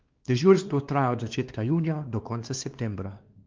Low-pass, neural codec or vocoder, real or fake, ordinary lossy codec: 7.2 kHz; codec, 16 kHz, 2 kbps, X-Codec, WavLM features, trained on Multilingual LibriSpeech; fake; Opus, 32 kbps